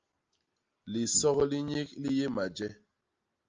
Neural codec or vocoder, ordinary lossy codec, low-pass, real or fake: none; Opus, 24 kbps; 7.2 kHz; real